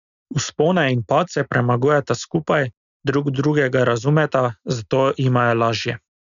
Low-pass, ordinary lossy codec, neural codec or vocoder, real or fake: 7.2 kHz; none; none; real